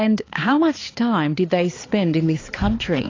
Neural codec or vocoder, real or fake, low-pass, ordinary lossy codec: codec, 16 kHz, 4 kbps, X-Codec, HuBERT features, trained on balanced general audio; fake; 7.2 kHz; AAC, 32 kbps